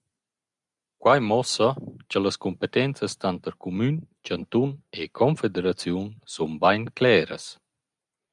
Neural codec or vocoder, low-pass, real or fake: none; 10.8 kHz; real